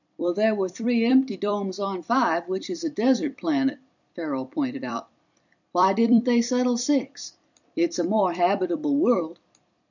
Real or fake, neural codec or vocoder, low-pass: fake; vocoder, 44.1 kHz, 128 mel bands every 256 samples, BigVGAN v2; 7.2 kHz